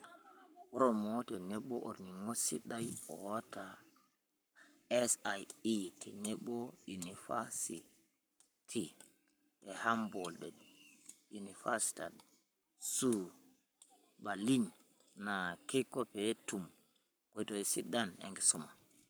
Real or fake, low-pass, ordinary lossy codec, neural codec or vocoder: fake; none; none; codec, 44.1 kHz, 7.8 kbps, Pupu-Codec